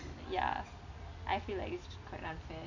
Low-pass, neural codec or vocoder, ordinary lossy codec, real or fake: 7.2 kHz; none; none; real